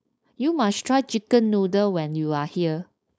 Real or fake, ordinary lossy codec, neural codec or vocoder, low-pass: fake; none; codec, 16 kHz, 4.8 kbps, FACodec; none